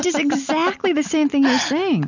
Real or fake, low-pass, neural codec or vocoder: real; 7.2 kHz; none